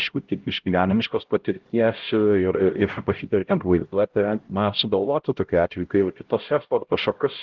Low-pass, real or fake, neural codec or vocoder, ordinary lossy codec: 7.2 kHz; fake; codec, 16 kHz, 0.5 kbps, X-Codec, HuBERT features, trained on LibriSpeech; Opus, 16 kbps